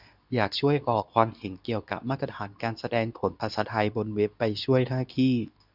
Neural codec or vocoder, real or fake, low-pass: codec, 24 kHz, 0.9 kbps, WavTokenizer, medium speech release version 2; fake; 5.4 kHz